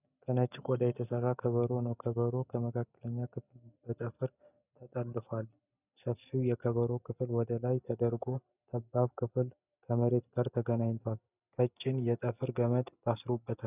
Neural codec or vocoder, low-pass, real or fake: none; 3.6 kHz; real